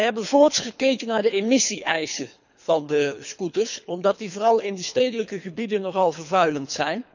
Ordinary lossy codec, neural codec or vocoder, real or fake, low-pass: none; codec, 24 kHz, 3 kbps, HILCodec; fake; 7.2 kHz